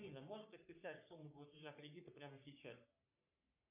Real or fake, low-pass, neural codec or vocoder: fake; 3.6 kHz; codec, 44.1 kHz, 3.4 kbps, Pupu-Codec